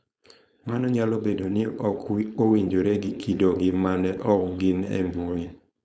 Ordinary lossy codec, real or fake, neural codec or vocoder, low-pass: none; fake; codec, 16 kHz, 4.8 kbps, FACodec; none